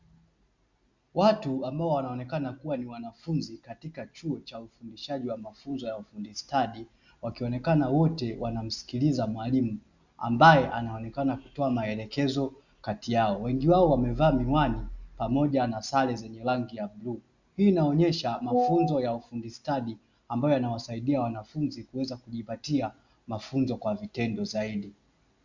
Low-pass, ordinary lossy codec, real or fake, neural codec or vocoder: 7.2 kHz; Opus, 64 kbps; real; none